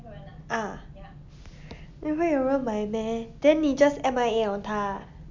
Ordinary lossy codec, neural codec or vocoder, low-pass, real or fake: MP3, 64 kbps; none; 7.2 kHz; real